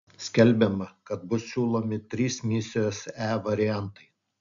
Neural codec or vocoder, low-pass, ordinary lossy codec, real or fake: none; 7.2 kHz; MP3, 64 kbps; real